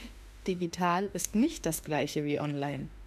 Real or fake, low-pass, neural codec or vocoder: fake; 14.4 kHz; autoencoder, 48 kHz, 32 numbers a frame, DAC-VAE, trained on Japanese speech